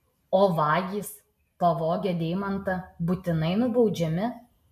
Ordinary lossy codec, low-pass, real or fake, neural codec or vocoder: MP3, 96 kbps; 14.4 kHz; real; none